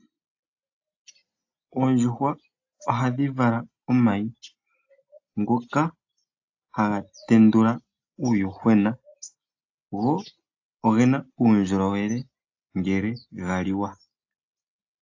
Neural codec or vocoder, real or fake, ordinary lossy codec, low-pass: none; real; AAC, 48 kbps; 7.2 kHz